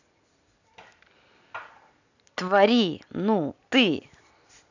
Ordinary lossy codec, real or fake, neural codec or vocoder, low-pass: none; real; none; 7.2 kHz